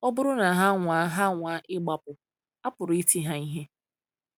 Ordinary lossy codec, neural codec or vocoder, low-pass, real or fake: none; none; none; real